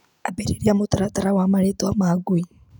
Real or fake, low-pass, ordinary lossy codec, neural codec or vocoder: real; 19.8 kHz; none; none